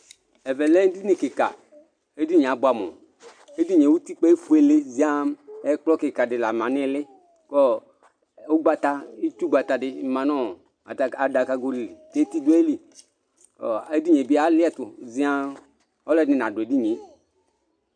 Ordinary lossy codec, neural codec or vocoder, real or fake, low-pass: MP3, 96 kbps; none; real; 9.9 kHz